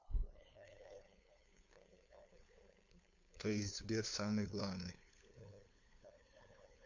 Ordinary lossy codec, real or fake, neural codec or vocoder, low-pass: MP3, 48 kbps; fake; codec, 16 kHz, 2 kbps, FunCodec, trained on LibriTTS, 25 frames a second; 7.2 kHz